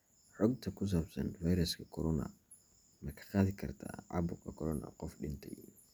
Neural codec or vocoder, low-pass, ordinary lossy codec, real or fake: none; none; none; real